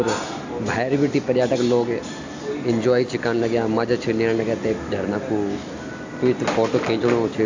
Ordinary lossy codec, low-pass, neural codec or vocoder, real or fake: AAC, 48 kbps; 7.2 kHz; none; real